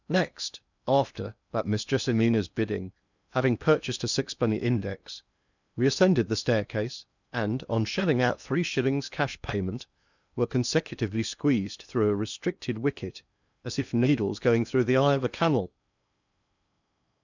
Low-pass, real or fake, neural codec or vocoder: 7.2 kHz; fake; codec, 16 kHz in and 24 kHz out, 0.8 kbps, FocalCodec, streaming, 65536 codes